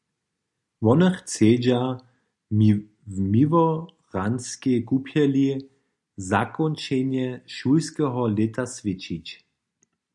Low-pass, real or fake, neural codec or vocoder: 10.8 kHz; real; none